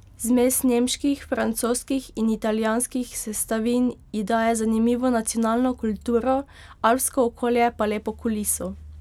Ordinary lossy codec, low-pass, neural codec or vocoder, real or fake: none; 19.8 kHz; none; real